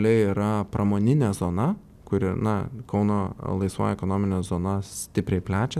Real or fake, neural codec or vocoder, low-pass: real; none; 14.4 kHz